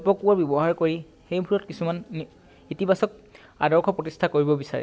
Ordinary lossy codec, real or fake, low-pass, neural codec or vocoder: none; real; none; none